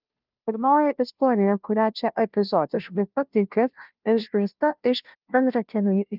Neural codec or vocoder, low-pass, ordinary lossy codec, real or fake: codec, 16 kHz, 0.5 kbps, FunCodec, trained on Chinese and English, 25 frames a second; 5.4 kHz; Opus, 24 kbps; fake